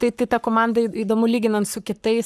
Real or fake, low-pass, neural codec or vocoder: fake; 14.4 kHz; codec, 44.1 kHz, 7.8 kbps, Pupu-Codec